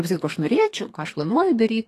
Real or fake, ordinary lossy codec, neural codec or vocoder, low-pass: fake; AAC, 48 kbps; codec, 32 kHz, 1.9 kbps, SNAC; 14.4 kHz